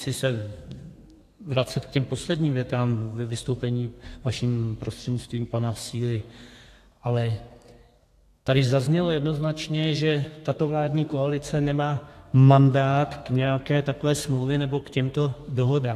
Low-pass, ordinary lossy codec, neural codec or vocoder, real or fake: 14.4 kHz; AAC, 64 kbps; codec, 32 kHz, 1.9 kbps, SNAC; fake